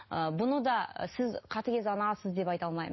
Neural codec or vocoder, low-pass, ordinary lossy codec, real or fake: none; 7.2 kHz; MP3, 24 kbps; real